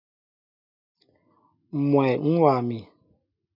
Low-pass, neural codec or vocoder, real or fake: 5.4 kHz; none; real